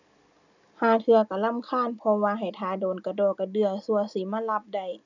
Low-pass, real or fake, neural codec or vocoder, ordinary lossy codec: 7.2 kHz; real; none; none